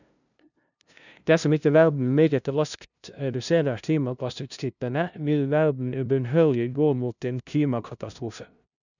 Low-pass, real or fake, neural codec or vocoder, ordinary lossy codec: 7.2 kHz; fake; codec, 16 kHz, 0.5 kbps, FunCodec, trained on LibriTTS, 25 frames a second; none